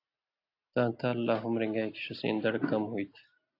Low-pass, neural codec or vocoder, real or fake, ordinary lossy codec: 5.4 kHz; none; real; AAC, 32 kbps